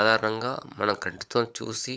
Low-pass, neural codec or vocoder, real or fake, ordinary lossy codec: none; codec, 16 kHz, 16 kbps, FunCodec, trained on LibriTTS, 50 frames a second; fake; none